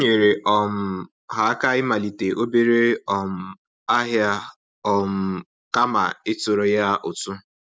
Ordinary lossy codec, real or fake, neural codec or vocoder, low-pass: none; real; none; none